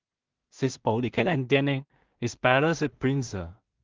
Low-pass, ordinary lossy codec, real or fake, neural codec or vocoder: 7.2 kHz; Opus, 32 kbps; fake; codec, 16 kHz in and 24 kHz out, 0.4 kbps, LongCat-Audio-Codec, two codebook decoder